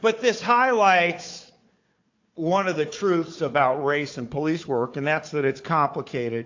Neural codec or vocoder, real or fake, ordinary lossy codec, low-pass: codec, 16 kHz, 4 kbps, FunCodec, trained on Chinese and English, 50 frames a second; fake; AAC, 48 kbps; 7.2 kHz